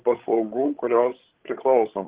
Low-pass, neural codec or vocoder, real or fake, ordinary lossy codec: 3.6 kHz; codec, 16 kHz, 16 kbps, FreqCodec, larger model; fake; Opus, 16 kbps